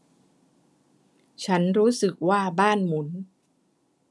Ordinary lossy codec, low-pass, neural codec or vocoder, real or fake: none; none; none; real